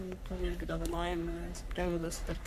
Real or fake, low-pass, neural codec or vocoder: fake; 14.4 kHz; codec, 44.1 kHz, 3.4 kbps, Pupu-Codec